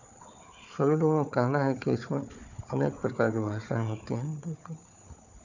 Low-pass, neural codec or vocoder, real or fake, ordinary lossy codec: 7.2 kHz; codec, 16 kHz, 4 kbps, FunCodec, trained on Chinese and English, 50 frames a second; fake; none